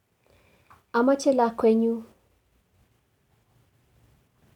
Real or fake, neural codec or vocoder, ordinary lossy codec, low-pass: real; none; none; 19.8 kHz